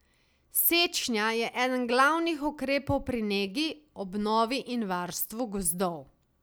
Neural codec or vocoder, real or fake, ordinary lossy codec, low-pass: none; real; none; none